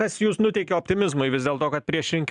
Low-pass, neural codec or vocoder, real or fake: 10.8 kHz; vocoder, 44.1 kHz, 128 mel bands every 512 samples, BigVGAN v2; fake